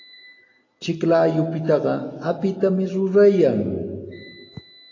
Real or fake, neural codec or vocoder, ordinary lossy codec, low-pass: real; none; AAC, 32 kbps; 7.2 kHz